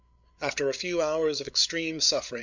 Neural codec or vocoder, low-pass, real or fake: codec, 16 kHz, 8 kbps, FreqCodec, larger model; 7.2 kHz; fake